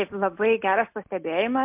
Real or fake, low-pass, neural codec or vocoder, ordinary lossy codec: real; 3.6 kHz; none; MP3, 32 kbps